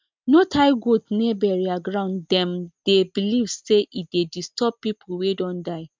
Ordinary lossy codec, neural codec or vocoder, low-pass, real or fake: MP3, 64 kbps; none; 7.2 kHz; real